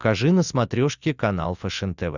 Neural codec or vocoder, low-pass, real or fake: none; 7.2 kHz; real